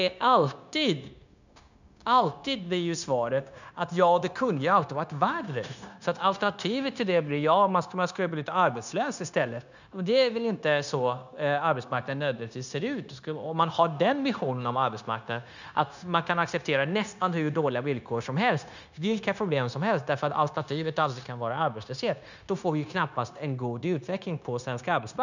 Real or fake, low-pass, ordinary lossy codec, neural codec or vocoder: fake; 7.2 kHz; none; codec, 16 kHz, 0.9 kbps, LongCat-Audio-Codec